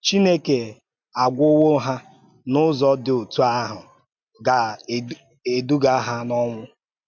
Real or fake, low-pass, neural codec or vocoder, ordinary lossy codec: real; 7.2 kHz; none; MP3, 64 kbps